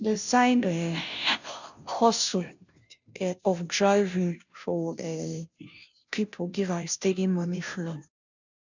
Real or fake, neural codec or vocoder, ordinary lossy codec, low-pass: fake; codec, 16 kHz, 0.5 kbps, FunCodec, trained on Chinese and English, 25 frames a second; none; 7.2 kHz